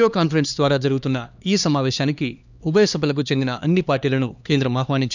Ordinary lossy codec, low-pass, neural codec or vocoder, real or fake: none; 7.2 kHz; codec, 16 kHz, 2 kbps, X-Codec, HuBERT features, trained on LibriSpeech; fake